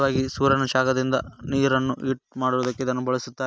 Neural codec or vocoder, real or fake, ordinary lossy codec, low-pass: none; real; none; none